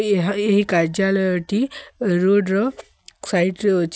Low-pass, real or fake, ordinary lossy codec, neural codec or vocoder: none; real; none; none